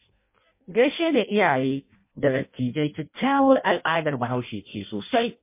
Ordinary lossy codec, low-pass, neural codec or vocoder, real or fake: MP3, 24 kbps; 3.6 kHz; codec, 16 kHz in and 24 kHz out, 0.6 kbps, FireRedTTS-2 codec; fake